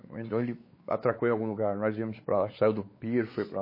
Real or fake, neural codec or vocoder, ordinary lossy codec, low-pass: fake; codec, 16 kHz, 4 kbps, X-Codec, WavLM features, trained on Multilingual LibriSpeech; MP3, 24 kbps; 5.4 kHz